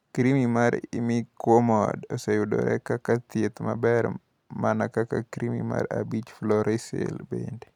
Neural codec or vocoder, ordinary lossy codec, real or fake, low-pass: none; none; real; 19.8 kHz